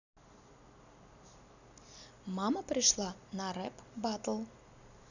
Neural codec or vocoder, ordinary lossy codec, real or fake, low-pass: none; none; real; 7.2 kHz